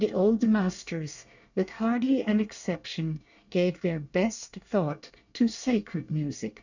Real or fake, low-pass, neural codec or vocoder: fake; 7.2 kHz; codec, 24 kHz, 1 kbps, SNAC